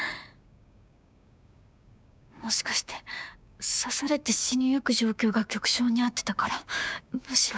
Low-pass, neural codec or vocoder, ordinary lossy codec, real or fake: none; codec, 16 kHz, 6 kbps, DAC; none; fake